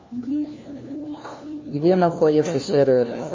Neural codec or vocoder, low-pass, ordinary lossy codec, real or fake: codec, 16 kHz, 1 kbps, FunCodec, trained on LibriTTS, 50 frames a second; 7.2 kHz; MP3, 32 kbps; fake